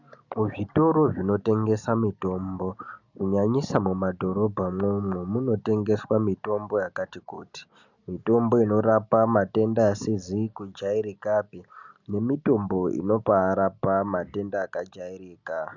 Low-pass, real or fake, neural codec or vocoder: 7.2 kHz; real; none